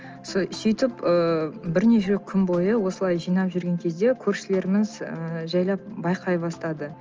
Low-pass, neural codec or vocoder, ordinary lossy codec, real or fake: 7.2 kHz; none; Opus, 24 kbps; real